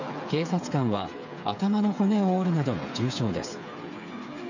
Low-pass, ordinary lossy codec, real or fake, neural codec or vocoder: 7.2 kHz; none; fake; codec, 16 kHz, 8 kbps, FreqCodec, smaller model